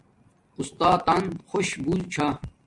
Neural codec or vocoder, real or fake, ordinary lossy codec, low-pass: none; real; MP3, 64 kbps; 10.8 kHz